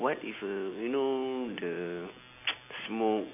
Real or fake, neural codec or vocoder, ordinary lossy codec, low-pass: real; none; AAC, 32 kbps; 3.6 kHz